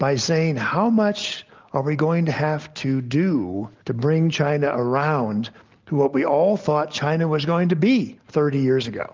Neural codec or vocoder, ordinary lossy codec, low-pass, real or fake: none; Opus, 24 kbps; 7.2 kHz; real